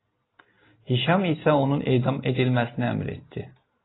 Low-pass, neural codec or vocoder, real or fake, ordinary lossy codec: 7.2 kHz; none; real; AAC, 16 kbps